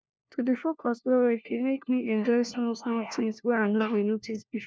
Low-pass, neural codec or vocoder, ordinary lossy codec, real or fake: none; codec, 16 kHz, 1 kbps, FunCodec, trained on LibriTTS, 50 frames a second; none; fake